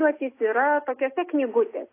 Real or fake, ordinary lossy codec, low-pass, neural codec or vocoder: real; AAC, 24 kbps; 3.6 kHz; none